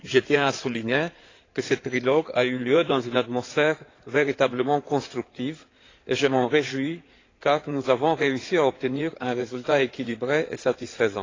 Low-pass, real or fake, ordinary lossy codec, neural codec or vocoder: 7.2 kHz; fake; AAC, 32 kbps; codec, 16 kHz in and 24 kHz out, 2.2 kbps, FireRedTTS-2 codec